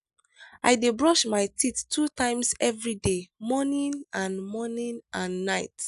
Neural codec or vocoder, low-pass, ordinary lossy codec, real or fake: none; 10.8 kHz; none; real